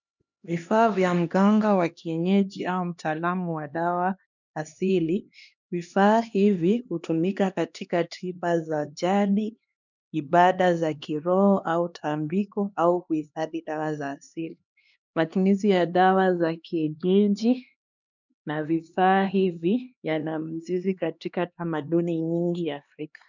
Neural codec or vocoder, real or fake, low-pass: codec, 16 kHz, 2 kbps, X-Codec, HuBERT features, trained on LibriSpeech; fake; 7.2 kHz